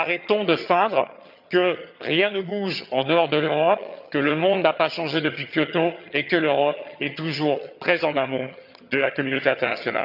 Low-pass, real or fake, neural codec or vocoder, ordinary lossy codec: 5.4 kHz; fake; vocoder, 22.05 kHz, 80 mel bands, HiFi-GAN; none